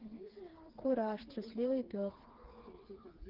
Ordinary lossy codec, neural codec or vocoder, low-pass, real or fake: Opus, 16 kbps; codec, 16 kHz, 4 kbps, FreqCodec, smaller model; 5.4 kHz; fake